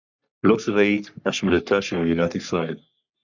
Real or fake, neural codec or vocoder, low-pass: fake; codec, 44.1 kHz, 3.4 kbps, Pupu-Codec; 7.2 kHz